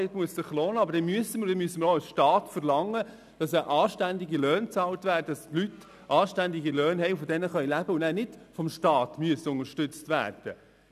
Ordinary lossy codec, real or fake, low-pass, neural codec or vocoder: none; real; 14.4 kHz; none